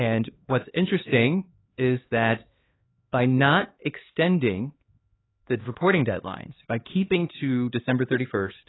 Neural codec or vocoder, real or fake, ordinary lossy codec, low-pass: codec, 16 kHz, 2 kbps, X-Codec, HuBERT features, trained on LibriSpeech; fake; AAC, 16 kbps; 7.2 kHz